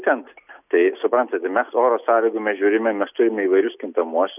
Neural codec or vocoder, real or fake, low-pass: none; real; 3.6 kHz